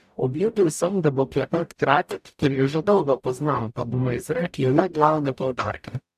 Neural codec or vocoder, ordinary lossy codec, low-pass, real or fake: codec, 44.1 kHz, 0.9 kbps, DAC; AAC, 96 kbps; 14.4 kHz; fake